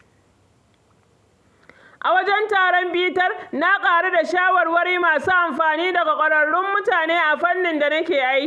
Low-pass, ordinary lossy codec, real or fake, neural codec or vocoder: none; none; real; none